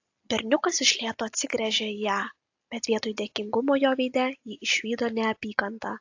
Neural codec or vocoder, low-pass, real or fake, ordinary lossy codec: none; 7.2 kHz; real; AAC, 48 kbps